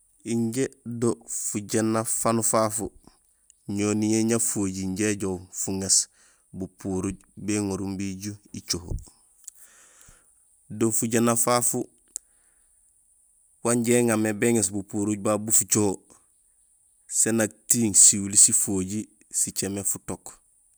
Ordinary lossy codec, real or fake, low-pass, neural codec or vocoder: none; real; none; none